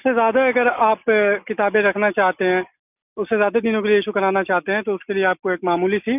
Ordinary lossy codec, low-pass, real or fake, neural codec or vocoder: none; 3.6 kHz; real; none